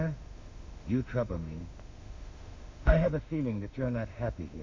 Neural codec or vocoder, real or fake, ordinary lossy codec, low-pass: autoencoder, 48 kHz, 32 numbers a frame, DAC-VAE, trained on Japanese speech; fake; MP3, 64 kbps; 7.2 kHz